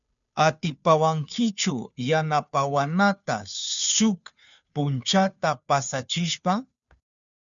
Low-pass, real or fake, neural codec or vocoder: 7.2 kHz; fake; codec, 16 kHz, 2 kbps, FunCodec, trained on Chinese and English, 25 frames a second